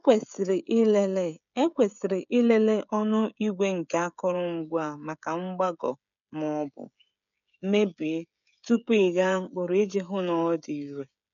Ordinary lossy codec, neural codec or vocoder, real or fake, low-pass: none; codec, 16 kHz, 16 kbps, FreqCodec, smaller model; fake; 7.2 kHz